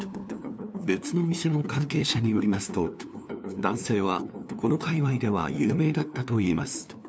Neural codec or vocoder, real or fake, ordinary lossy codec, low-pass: codec, 16 kHz, 2 kbps, FunCodec, trained on LibriTTS, 25 frames a second; fake; none; none